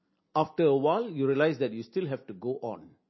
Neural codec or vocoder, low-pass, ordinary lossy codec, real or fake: none; 7.2 kHz; MP3, 24 kbps; real